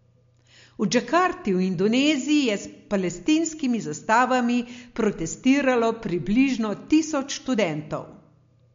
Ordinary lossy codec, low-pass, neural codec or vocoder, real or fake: MP3, 48 kbps; 7.2 kHz; none; real